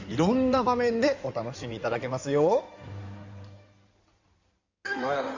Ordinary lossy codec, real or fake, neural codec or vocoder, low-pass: Opus, 64 kbps; fake; codec, 16 kHz in and 24 kHz out, 2.2 kbps, FireRedTTS-2 codec; 7.2 kHz